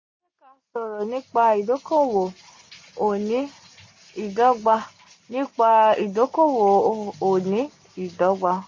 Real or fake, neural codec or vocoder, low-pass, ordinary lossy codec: real; none; 7.2 kHz; MP3, 32 kbps